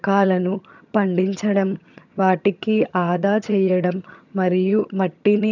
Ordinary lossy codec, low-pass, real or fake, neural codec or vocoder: none; 7.2 kHz; fake; vocoder, 22.05 kHz, 80 mel bands, HiFi-GAN